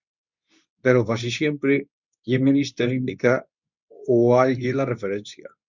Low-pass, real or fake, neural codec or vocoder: 7.2 kHz; fake; codec, 24 kHz, 0.9 kbps, WavTokenizer, medium speech release version 2